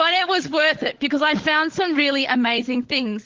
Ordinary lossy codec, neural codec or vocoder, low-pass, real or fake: Opus, 16 kbps; codec, 16 kHz, 16 kbps, FunCodec, trained on LibriTTS, 50 frames a second; 7.2 kHz; fake